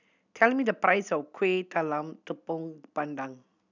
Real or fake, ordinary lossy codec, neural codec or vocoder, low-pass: real; none; none; 7.2 kHz